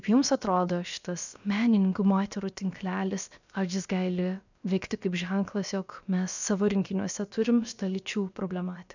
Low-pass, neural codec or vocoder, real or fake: 7.2 kHz; codec, 16 kHz, about 1 kbps, DyCAST, with the encoder's durations; fake